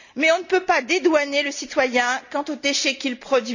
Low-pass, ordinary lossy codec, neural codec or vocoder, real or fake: 7.2 kHz; none; none; real